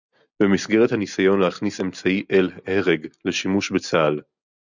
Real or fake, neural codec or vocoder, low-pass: real; none; 7.2 kHz